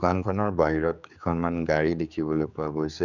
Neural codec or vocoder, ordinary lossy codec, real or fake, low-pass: codec, 16 kHz, 4 kbps, X-Codec, HuBERT features, trained on general audio; none; fake; 7.2 kHz